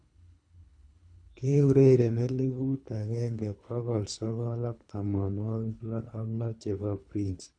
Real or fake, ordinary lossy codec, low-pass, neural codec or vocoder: fake; none; 9.9 kHz; codec, 24 kHz, 3 kbps, HILCodec